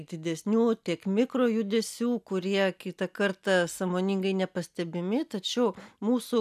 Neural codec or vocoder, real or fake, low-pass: none; real; 14.4 kHz